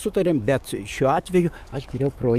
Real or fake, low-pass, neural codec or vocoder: fake; 14.4 kHz; codec, 44.1 kHz, 7.8 kbps, DAC